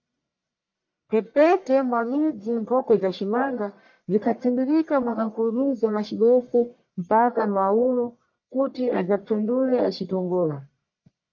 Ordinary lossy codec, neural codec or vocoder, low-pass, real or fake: MP3, 48 kbps; codec, 44.1 kHz, 1.7 kbps, Pupu-Codec; 7.2 kHz; fake